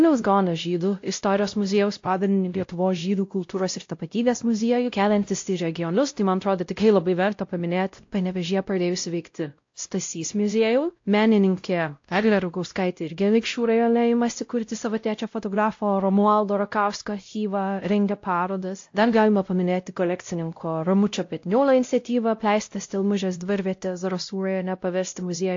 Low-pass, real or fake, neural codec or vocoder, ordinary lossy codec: 7.2 kHz; fake; codec, 16 kHz, 0.5 kbps, X-Codec, WavLM features, trained on Multilingual LibriSpeech; AAC, 48 kbps